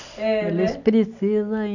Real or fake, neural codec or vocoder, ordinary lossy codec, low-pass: real; none; none; 7.2 kHz